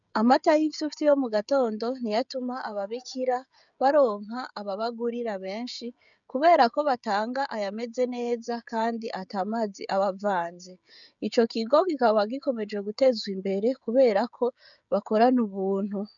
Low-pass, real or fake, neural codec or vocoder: 7.2 kHz; fake; codec, 16 kHz, 16 kbps, FreqCodec, smaller model